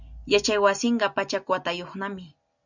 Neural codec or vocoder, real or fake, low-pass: none; real; 7.2 kHz